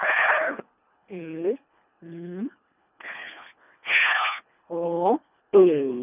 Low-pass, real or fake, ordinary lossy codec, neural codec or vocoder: 3.6 kHz; fake; none; codec, 24 kHz, 1.5 kbps, HILCodec